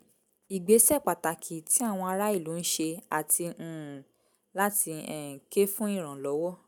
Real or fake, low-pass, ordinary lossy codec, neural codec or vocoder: real; none; none; none